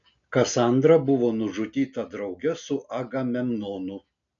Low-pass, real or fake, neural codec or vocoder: 7.2 kHz; real; none